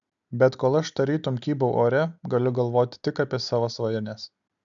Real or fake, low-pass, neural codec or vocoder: real; 7.2 kHz; none